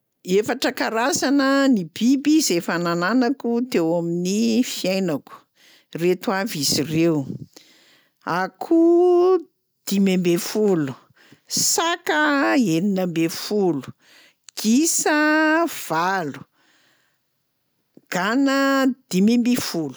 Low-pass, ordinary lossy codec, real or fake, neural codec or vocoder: none; none; real; none